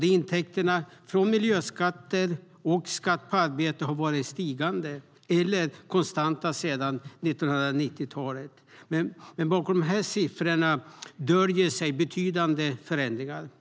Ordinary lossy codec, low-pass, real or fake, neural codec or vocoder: none; none; real; none